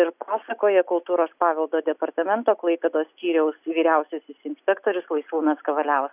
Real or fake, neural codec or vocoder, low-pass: real; none; 3.6 kHz